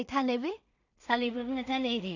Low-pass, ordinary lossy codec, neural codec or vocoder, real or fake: 7.2 kHz; none; codec, 16 kHz in and 24 kHz out, 0.4 kbps, LongCat-Audio-Codec, two codebook decoder; fake